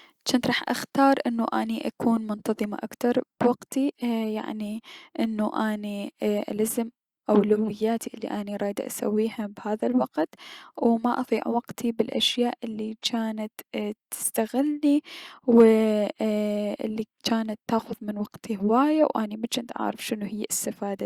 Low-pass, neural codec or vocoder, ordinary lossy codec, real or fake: 19.8 kHz; none; Opus, 64 kbps; real